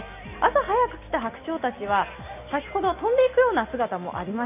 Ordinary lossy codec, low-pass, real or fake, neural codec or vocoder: none; 3.6 kHz; real; none